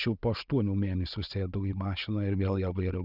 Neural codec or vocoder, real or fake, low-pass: none; real; 5.4 kHz